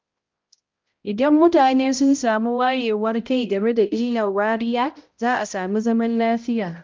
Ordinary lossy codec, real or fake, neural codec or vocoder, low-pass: Opus, 32 kbps; fake; codec, 16 kHz, 0.5 kbps, X-Codec, HuBERT features, trained on balanced general audio; 7.2 kHz